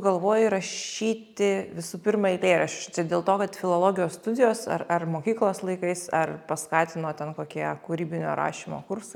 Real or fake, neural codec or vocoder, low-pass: real; none; 19.8 kHz